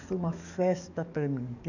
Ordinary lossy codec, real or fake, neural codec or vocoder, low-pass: none; fake; codec, 44.1 kHz, 7.8 kbps, DAC; 7.2 kHz